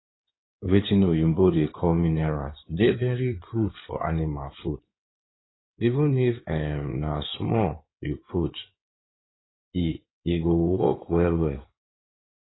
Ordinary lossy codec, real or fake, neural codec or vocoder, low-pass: AAC, 16 kbps; fake; codec, 16 kHz in and 24 kHz out, 2.2 kbps, FireRedTTS-2 codec; 7.2 kHz